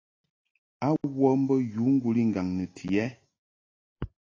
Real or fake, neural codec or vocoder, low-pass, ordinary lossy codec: real; none; 7.2 kHz; AAC, 32 kbps